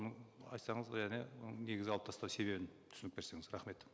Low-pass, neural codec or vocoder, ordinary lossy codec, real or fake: none; none; none; real